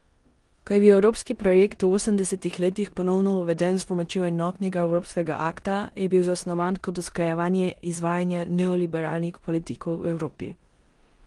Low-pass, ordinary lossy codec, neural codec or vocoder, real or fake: 10.8 kHz; Opus, 24 kbps; codec, 16 kHz in and 24 kHz out, 0.9 kbps, LongCat-Audio-Codec, four codebook decoder; fake